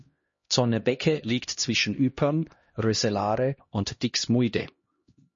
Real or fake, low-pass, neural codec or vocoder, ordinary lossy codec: fake; 7.2 kHz; codec, 16 kHz, 1 kbps, X-Codec, HuBERT features, trained on LibriSpeech; MP3, 32 kbps